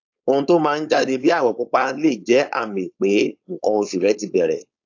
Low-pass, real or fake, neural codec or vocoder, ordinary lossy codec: 7.2 kHz; fake; codec, 16 kHz, 4.8 kbps, FACodec; AAC, 48 kbps